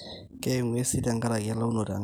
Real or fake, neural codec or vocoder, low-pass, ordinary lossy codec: real; none; none; none